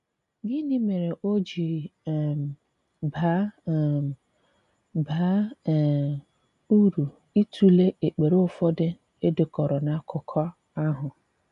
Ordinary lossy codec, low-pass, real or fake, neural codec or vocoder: none; 10.8 kHz; real; none